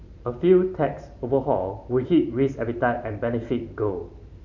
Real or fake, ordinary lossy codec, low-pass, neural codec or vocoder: fake; none; 7.2 kHz; vocoder, 44.1 kHz, 128 mel bands every 512 samples, BigVGAN v2